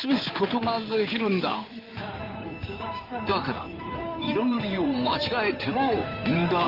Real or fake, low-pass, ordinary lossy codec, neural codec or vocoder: fake; 5.4 kHz; Opus, 24 kbps; codec, 16 kHz in and 24 kHz out, 2.2 kbps, FireRedTTS-2 codec